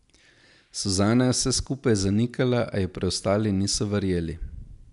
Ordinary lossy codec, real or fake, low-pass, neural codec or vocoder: none; real; 10.8 kHz; none